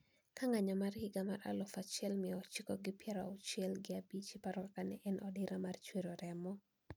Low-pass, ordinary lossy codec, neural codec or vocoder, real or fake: none; none; none; real